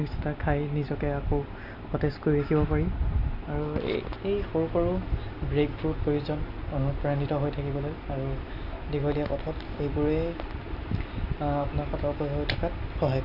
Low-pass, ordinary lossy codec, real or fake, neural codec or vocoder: 5.4 kHz; none; real; none